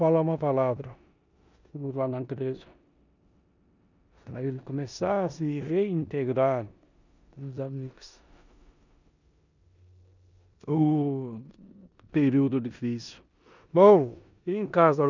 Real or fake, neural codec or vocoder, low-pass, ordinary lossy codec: fake; codec, 16 kHz in and 24 kHz out, 0.9 kbps, LongCat-Audio-Codec, four codebook decoder; 7.2 kHz; none